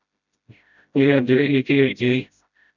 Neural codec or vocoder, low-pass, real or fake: codec, 16 kHz, 0.5 kbps, FreqCodec, smaller model; 7.2 kHz; fake